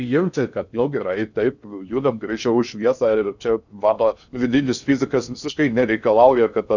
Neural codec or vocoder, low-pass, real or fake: codec, 16 kHz in and 24 kHz out, 0.6 kbps, FocalCodec, streaming, 2048 codes; 7.2 kHz; fake